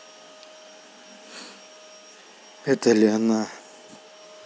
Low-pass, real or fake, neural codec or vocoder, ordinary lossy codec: none; real; none; none